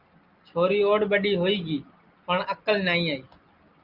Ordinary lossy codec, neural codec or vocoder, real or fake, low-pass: Opus, 24 kbps; none; real; 5.4 kHz